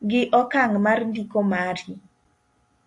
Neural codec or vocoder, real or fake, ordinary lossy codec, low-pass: none; real; AAC, 48 kbps; 10.8 kHz